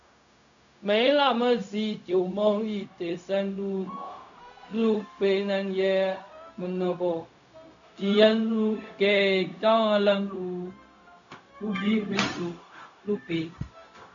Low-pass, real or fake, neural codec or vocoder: 7.2 kHz; fake; codec, 16 kHz, 0.4 kbps, LongCat-Audio-Codec